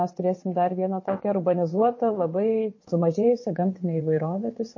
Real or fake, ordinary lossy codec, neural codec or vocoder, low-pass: fake; MP3, 32 kbps; vocoder, 22.05 kHz, 80 mel bands, WaveNeXt; 7.2 kHz